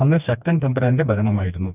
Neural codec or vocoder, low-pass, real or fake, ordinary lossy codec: codec, 16 kHz, 2 kbps, FreqCodec, smaller model; 3.6 kHz; fake; none